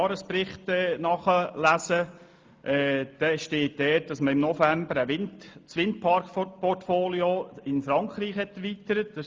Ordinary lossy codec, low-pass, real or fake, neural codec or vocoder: Opus, 24 kbps; 7.2 kHz; real; none